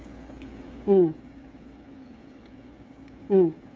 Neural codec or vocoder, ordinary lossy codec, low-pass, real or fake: codec, 16 kHz, 8 kbps, FreqCodec, smaller model; none; none; fake